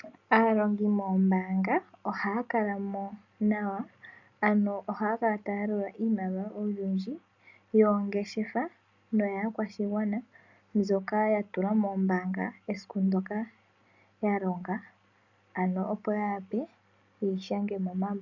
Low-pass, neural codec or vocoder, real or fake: 7.2 kHz; none; real